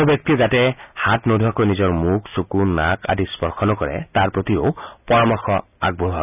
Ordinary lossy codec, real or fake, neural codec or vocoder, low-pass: none; real; none; 3.6 kHz